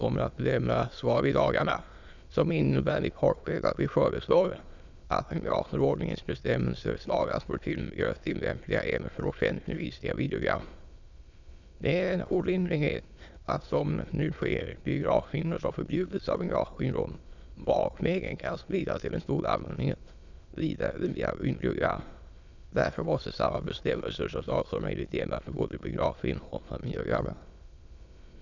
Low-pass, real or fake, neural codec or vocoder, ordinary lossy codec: 7.2 kHz; fake; autoencoder, 22.05 kHz, a latent of 192 numbers a frame, VITS, trained on many speakers; none